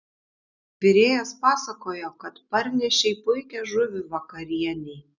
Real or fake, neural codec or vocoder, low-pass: real; none; 7.2 kHz